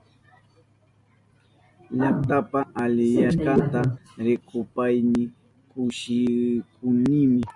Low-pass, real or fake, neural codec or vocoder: 10.8 kHz; real; none